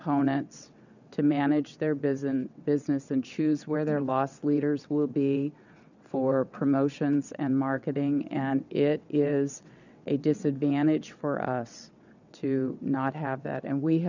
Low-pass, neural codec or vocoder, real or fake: 7.2 kHz; vocoder, 22.05 kHz, 80 mel bands, WaveNeXt; fake